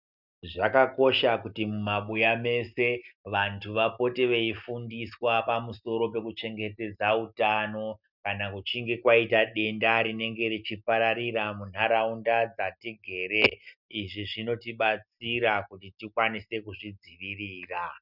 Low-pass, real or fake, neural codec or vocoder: 5.4 kHz; real; none